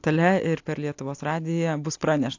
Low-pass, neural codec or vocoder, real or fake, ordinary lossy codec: 7.2 kHz; none; real; AAC, 48 kbps